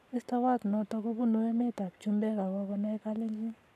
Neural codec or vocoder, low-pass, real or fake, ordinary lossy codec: codec, 44.1 kHz, 7.8 kbps, Pupu-Codec; 14.4 kHz; fake; none